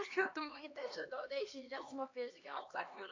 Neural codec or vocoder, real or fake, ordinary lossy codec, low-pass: codec, 16 kHz, 2 kbps, X-Codec, HuBERT features, trained on LibriSpeech; fake; AAC, 48 kbps; 7.2 kHz